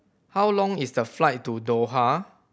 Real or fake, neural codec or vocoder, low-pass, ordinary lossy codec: real; none; none; none